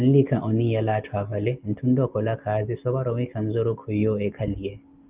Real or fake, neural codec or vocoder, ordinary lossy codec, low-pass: real; none; Opus, 32 kbps; 3.6 kHz